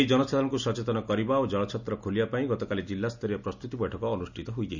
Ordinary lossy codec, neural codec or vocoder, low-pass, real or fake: none; none; 7.2 kHz; real